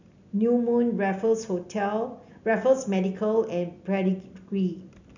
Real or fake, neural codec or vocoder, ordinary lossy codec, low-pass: real; none; none; 7.2 kHz